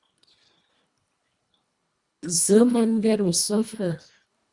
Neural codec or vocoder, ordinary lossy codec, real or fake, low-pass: codec, 24 kHz, 1.5 kbps, HILCodec; Opus, 64 kbps; fake; 10.8 kHz